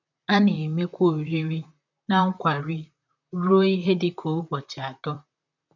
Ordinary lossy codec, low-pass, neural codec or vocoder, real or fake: none; 7.2 kHz; vocoder, 44.1 kHz, 128 mel bands, Pupu-Vocoder; fake